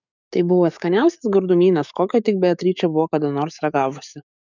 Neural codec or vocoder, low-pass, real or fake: codec, 44.1 kHz, 7.8 kbps, DAC; 7.2 kHz; fake